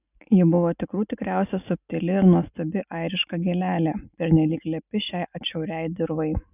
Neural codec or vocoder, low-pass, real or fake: none; 3.6 kHz; real